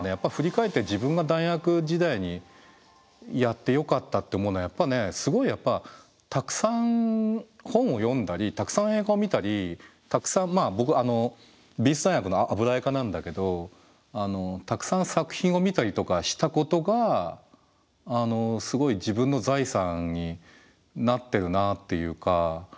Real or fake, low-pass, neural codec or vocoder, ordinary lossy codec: real; none; none; none